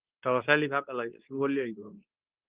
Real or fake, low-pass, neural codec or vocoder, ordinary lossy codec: fake; 3.6 kHz; codec, 24 kHz, 0.9 kbps, WavTokenizer, medium speech release version 2; Opus, 32 kbps